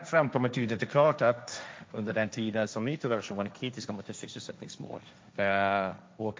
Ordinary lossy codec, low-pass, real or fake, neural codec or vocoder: none; none; fake; codec, 16 kHz, 1.1 kbps, Voila-Tokenizer